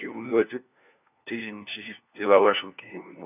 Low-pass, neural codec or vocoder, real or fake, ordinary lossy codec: 3.6 kHz; codec, 16 kHz, 1 kbps, FunCodec, trained on LibriTTS, 50 frames a second; fake; none